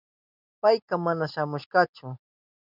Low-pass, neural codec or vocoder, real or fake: 5.4 kHz; none; real